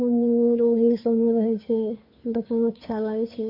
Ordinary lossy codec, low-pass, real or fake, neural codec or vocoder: AAC, 32 kbps; 5.4 kHz; fake; codec, 16 kHz, 2 kbps, FunCodec, trained on Chinese and English, 25 frames a second